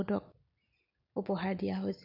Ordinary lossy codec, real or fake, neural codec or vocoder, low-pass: none; real; none; 5.4 kHz